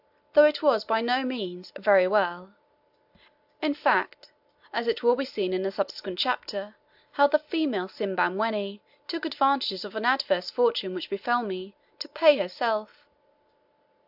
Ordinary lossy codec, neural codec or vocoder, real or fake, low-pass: AAC, 48 kbps; none; real; 5.4 kHz